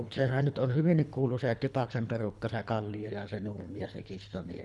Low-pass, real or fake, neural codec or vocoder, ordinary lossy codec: none; fake; codec, 24 kHz, 3 kbps, HILCodec; none